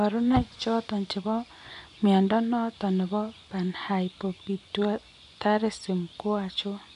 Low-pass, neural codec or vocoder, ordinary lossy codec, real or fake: 10.8 kHz; vocoder, 24 kHz, 100 mel bands, Vocos; AAC, 96 kbps; fake